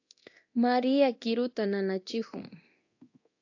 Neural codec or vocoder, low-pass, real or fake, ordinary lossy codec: codec, 24 kHz, 0.9 kbps, DualCodec; 7.2 kHz; fake; AAC, 48 kbps